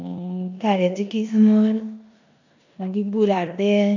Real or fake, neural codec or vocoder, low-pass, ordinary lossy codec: fake; codec, 16 kHz in and 24 kHz out, 0.9 kbps, LongCat-Audio-Codec, four codebook decoder; 7.2 kHz; none